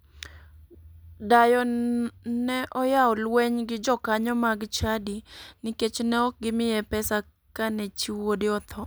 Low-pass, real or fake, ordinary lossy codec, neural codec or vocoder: none; real; none; none